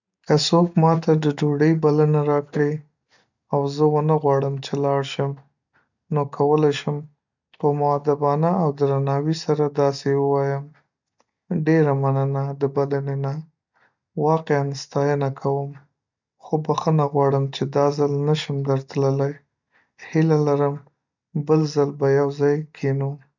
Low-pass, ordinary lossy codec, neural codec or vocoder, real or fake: 7.2 kHz; none; none; real